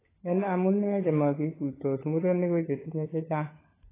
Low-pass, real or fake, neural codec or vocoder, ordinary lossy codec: 3.6 kHz; fake; codec, 16 kHz, 16 kbps, FunCodec, trained on LibriTTS, 50 frames a second; AAC, 16 kbps